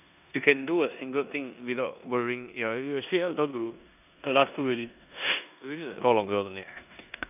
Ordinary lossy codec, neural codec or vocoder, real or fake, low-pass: none; codec, 16 kHz in and 24 kHz out, 0.9 kbps, LongCat-Audio-Codec, four codebook decoder; fake; 3.6 kHz